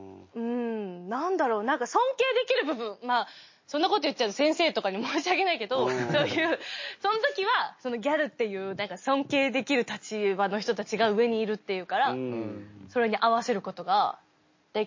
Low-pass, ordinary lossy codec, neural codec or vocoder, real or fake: 7.2 kHz; MP3, 32 kbps; none; real